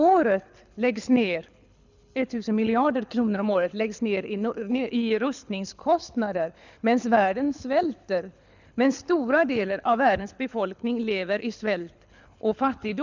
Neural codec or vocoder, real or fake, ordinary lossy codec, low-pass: codec, 24 kHz, 6 kbps, HILCodec; fake; none; 7.2 kHz